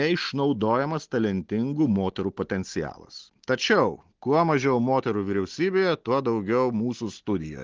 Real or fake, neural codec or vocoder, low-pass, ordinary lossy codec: real; none; 7.2 kHz; Opus, 16 kbps